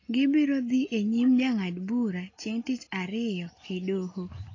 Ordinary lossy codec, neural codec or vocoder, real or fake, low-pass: AAC, 32 kbps; none; real; 7.2 kHz